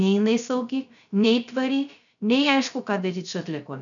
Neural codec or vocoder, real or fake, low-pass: codec, 16 kHz, 0.3 kbps, FocalCodec; fake; 7.2 kHz